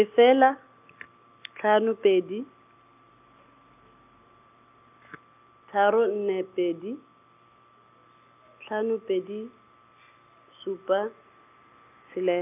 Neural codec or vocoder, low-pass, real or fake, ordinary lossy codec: none; 3.6 kHz; real; none